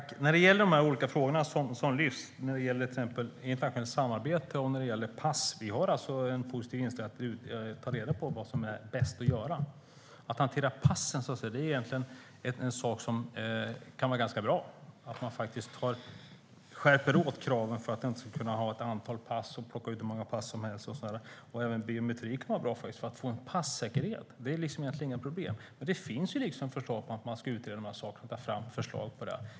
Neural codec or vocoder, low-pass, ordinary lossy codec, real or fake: none; none; none; real